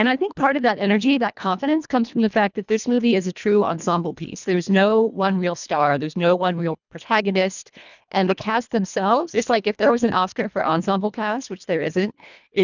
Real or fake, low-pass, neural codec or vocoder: fake; 7.2 kHz; codec, 24 kHz, 1.5 kbps, HILCodec